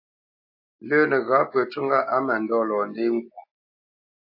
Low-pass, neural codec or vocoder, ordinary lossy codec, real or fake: 5.4 kHz; none; AAC, 32 kbps; real